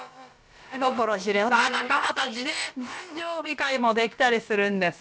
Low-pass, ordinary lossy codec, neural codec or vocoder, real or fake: none; none; codec, 16 kHz, about 1 kbps, DyCAST, with the encoder's durations; fake